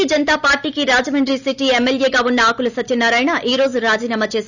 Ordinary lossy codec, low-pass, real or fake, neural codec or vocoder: none; 7.2 kHz; real; none